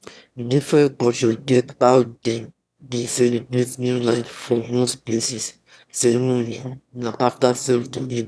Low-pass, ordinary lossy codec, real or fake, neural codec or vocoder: none; none; fake; autoencoder, 22.05 kHz, a latent of 192 numbers a frame, VITS, trained on one speaker